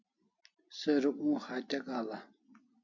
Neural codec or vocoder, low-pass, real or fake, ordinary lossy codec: none; 7.2 kHz; real; MP3, 48 kbps